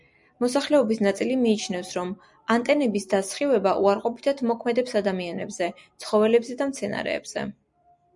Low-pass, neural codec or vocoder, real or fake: 10.8 kHz; none; real